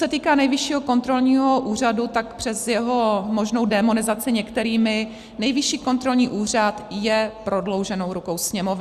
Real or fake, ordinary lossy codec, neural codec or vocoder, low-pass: real; Opus, 64 kbps; none; 14.4 kHz